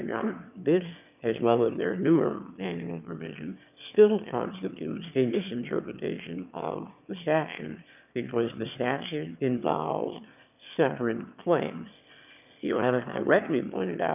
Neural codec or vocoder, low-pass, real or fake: autoencoder, 22.05 kHz, a latent of 192 numbers a frame, VITS, trained on one speaker; 3.6 kHz; fake